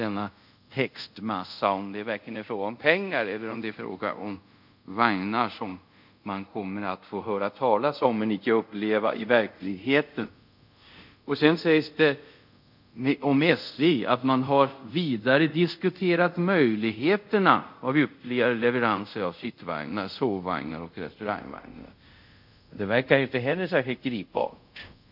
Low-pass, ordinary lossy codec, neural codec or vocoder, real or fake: 5.4 kHz; none; codec, 24 kHz, 0.5 kbps, DualCodec; fake